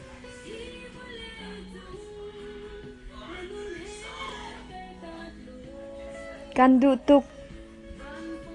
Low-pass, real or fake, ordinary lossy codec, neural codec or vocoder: 10.8 kHz; real; AAC, 48 kbps; none